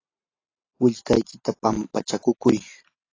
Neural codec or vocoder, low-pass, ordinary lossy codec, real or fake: none; 7.2 kHz; AAC, 32 kbps; real